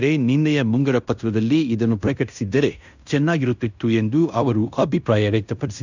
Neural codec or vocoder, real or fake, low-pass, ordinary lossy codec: codec, 16 kHz in and 24 kHz out, 0.9 kbps, LongCat-Audio-Codec, fine tuned four codebook decoder; fake; 7.2 kHz; none